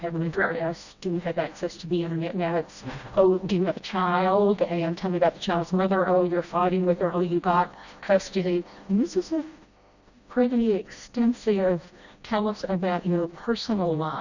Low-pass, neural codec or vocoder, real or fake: 7.2 kHz; codec, 16 kHz, 1 kbps, FreqCodec, smaller model; fake